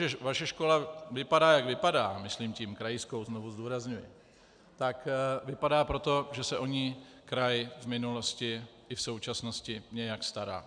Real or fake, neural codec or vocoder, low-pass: real; none; 9.9 kHz